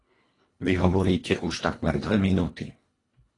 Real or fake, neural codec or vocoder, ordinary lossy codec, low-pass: fake; codec, 24 kHz, 1.5 kbps, HILCodec; AAC, 32 kbps; 10.8 kHz